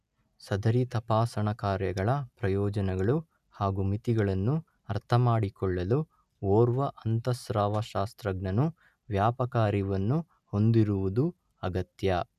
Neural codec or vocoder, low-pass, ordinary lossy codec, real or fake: none; 14.4 kHz; none; real